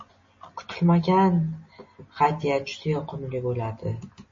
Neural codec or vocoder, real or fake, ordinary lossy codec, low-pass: none; real; MP3, 32 kbps; 7.2 kHz